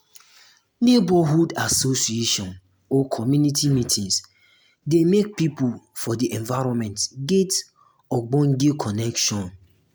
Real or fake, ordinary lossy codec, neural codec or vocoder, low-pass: real; none; none; none